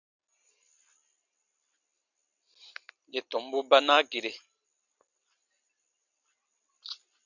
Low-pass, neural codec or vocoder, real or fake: 7.2 kHz; none; real